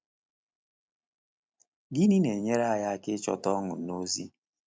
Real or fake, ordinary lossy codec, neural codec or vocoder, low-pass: real; none; none; none